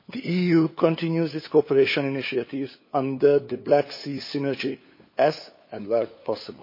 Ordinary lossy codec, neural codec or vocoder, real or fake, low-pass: MP3, 24 kbps; codec, 16 kHz, 8 kbps, FunCodec, trained on LibriTTS, 25 frames a second; fake; 5.4 kHz